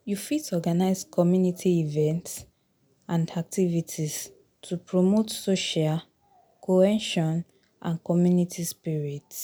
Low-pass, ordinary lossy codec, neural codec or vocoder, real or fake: none; none; none; real